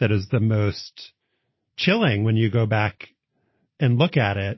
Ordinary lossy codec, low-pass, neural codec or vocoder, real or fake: MP3, 24 kbps; 7.2 kHz; none; real